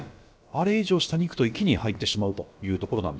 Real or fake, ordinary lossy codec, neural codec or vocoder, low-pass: fake; none; codec, 16 kHz, about 1 kbps, DyCAST, with the encoder's durations; none